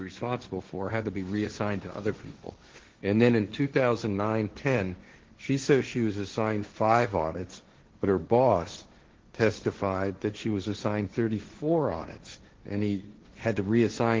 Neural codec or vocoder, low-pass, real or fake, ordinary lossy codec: codec, 16 kHz, 1.1 kbps, Voila-Tokenizer; 7.2 kHz; fake; Opus, 16 kbps